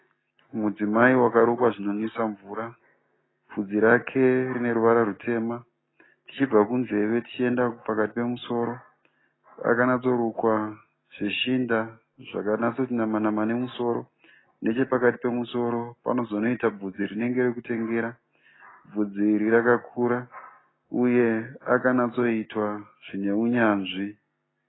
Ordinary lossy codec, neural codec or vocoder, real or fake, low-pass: AAC, 16 kbps; none; real; 7.2 kHz